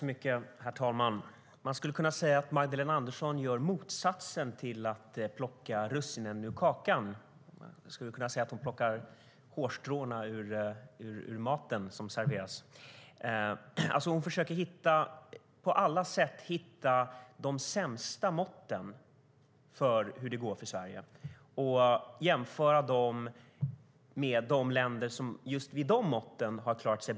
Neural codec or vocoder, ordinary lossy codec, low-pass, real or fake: none; none; none; real